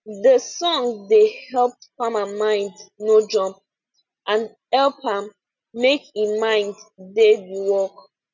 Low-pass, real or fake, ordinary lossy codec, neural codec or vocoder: 7.2 kHz; real; none; none